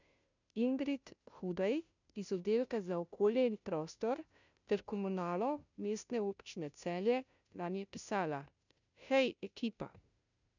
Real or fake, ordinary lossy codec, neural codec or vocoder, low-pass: fake; none; codec, 16 kHz, 0.5 kbps, FunCodec, trained on Chinese and English, 25 frames a second; 7.2 kHz